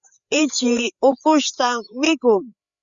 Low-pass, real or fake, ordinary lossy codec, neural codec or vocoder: 7.2 kHz; fake; Opus, 64 kbps; codec, 16 kHz, 4 kbps, FreqCodec, larger model